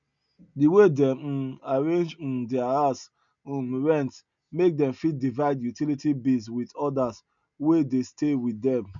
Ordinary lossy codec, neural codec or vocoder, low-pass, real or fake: none; none; 7.2 kHz; real